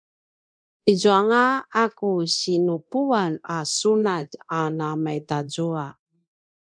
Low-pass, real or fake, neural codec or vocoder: 9.9 kHz; fake; codec, 24 kHz, 0.9 kbps, DualCodec